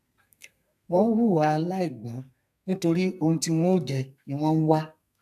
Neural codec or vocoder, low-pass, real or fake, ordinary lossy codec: codec, 32 kHz, 1.9 kbps, SNAC; 14.4 kHz; fake; none